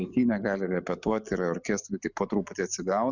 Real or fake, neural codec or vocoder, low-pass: real; none; 7.2 kHz